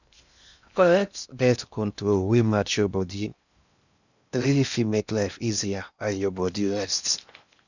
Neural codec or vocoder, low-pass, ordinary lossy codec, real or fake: codec, 16 kHz in and 24 kHz out, 0.6 kbps, FocalCodec, streaming, 2048 codes; 7.2 kHz; none; fake